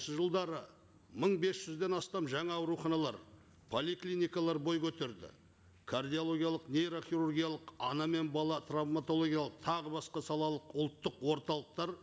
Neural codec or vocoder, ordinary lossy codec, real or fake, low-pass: none; none; real; none